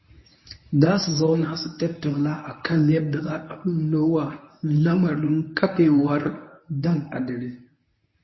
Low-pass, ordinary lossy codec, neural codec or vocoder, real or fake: 7.2 kHz; MP3, 24 kbps; codec, 24 kHz, 0.9 kbps, WavTokenizer, medium speech release version 1; fake